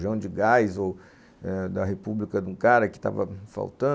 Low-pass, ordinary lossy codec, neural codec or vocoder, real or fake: none; none; none; real